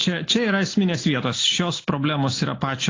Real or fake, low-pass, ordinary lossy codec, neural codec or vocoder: real; 7.2 kHz; AAC, 32 kbps; none